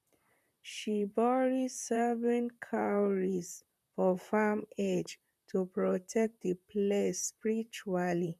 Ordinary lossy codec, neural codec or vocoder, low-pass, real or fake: none; vocoder, 44.1 kHz, 128 mel bands, Pupu-Vocoder; 14.4 kHz; fake